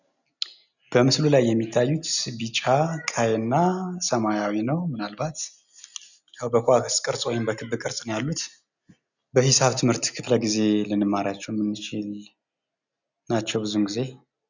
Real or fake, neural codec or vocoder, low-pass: real; none; 7.2 kHz